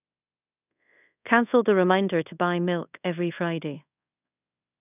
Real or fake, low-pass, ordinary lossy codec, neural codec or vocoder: fake; 3.6 kHz; none; codec, 24 kHz, 0.5 kbps, DualCodec